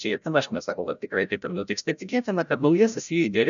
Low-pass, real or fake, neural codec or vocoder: 7.2 kHz; fake; codec, 16 kHz, 0.5 kbps, FreqCodec, larger model